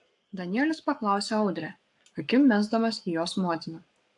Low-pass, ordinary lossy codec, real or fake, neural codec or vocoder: 10.8 kHz; AAC, 64 kbps; fake; codec, 44.1 kHz, 7.8 kbps, Pupu-Codec